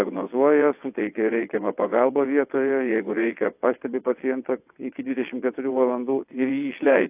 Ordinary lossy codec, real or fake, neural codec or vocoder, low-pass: AAC, 32 kbps; fake; vocoder, 22.05 kHz, 80 mel bands, WaveNeXt; 3.6 kHz